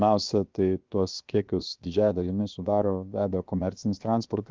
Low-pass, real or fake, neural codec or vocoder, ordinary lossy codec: 7.2 kHz; fake; codec, 16 kHz, 0.7 kbps, FocalCodec; Opus, 32 kbps